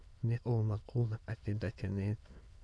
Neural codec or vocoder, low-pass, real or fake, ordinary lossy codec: autoencoder, 22.05 kHz, a latent of 192 numbers a frame, VITS, trained on many speakers; none; fake; none